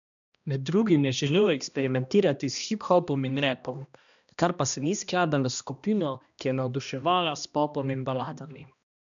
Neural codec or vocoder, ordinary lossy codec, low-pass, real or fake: codec, 16 kHz, 1 kbps, X-Codec, HuBERT features, trained on balanced general audio; none; 7.2 kHz; fake